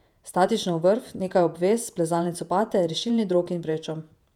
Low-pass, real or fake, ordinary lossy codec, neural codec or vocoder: 19.8 kHz; fake; none; vocoder, 48 kHz, 128 mel bands, Vocos